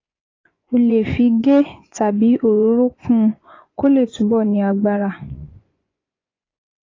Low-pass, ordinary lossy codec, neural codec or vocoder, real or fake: 7.2 kHz; AAC, 32 kbps; vocoder, 24 kHz, 100 mel bands, Vocos; fake